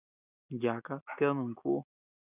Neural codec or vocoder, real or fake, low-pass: none; real; 3.6 kHz